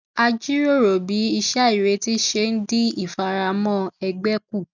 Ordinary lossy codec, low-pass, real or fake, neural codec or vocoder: none; 7.2 kHz; real; none